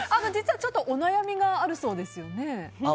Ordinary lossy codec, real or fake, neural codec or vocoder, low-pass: none; real; none; none